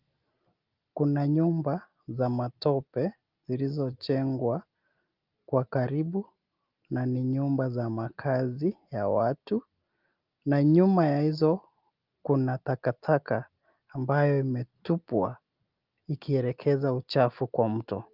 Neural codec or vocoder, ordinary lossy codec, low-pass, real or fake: none; Opus, 24 kbps; 5.4 kHz; real